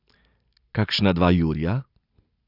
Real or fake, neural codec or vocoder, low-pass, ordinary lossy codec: real; none; 5.4 kHz; none